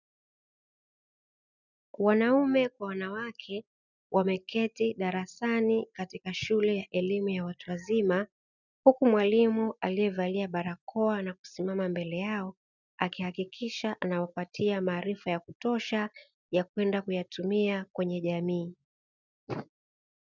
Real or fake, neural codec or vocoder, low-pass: real; none; 7.2 kHz